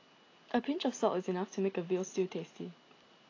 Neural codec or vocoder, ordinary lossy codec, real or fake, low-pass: none; AAC, 32 kbps; real; 7.2 kHz